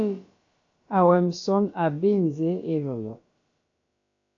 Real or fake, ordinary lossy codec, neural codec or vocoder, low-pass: fake; AAC, 48 kbps; codec, 16 kHz, about 1 kbps, DyCAST, with the encoder's durations; 7.2 kHz